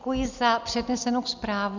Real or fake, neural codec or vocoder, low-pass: real; none; 7.2 kHz